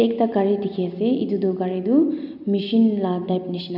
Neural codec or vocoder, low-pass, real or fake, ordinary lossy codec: none; 5.4 kHz; real; none